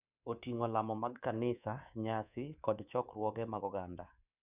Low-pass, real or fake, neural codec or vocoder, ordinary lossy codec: 3.6 kHz; fake; codec, 24 kHz, 3.1 kbps, DualCodec; none